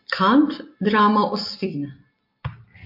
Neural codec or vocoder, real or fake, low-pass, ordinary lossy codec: none; real; 5.4 kHz; MP3, 32 kbps